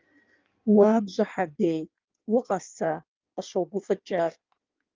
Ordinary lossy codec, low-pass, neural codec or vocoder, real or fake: Opus, 24 kbps; 7.2 kHz; codec, 16 kHz in and 24 kHz out, 1.1 kbps, FireRedTTS-2 codec; fake